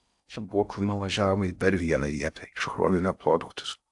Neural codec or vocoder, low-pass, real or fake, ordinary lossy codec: codec, 16 kHz in and 24 kHz out, 0.6 kbps, FocalCodec, streaming, 4096 codes; 10.8 kHz; fake; AAC, 64 kbps